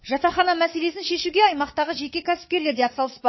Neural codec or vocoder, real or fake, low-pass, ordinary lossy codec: codec, 24 kHz, 3.1 kbps, DualCodec; fake; 7.2 kHz; MP3, 24 kbps